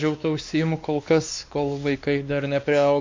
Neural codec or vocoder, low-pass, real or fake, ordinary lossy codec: codec, 16 kHz, 2 kbps, X-Codec, WavLM features, trained on Multilingual LibriSpeech; 7.2 kHz; fake; MP3, 64 kbps